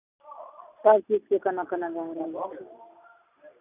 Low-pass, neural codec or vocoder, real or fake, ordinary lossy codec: 3.6 kHz; none; real; none